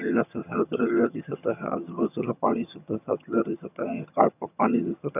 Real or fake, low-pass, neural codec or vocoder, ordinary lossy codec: fake; 3.6 kHz; vocoder, 22.05 kHz, 80 mel bands, HiFi-GAN; none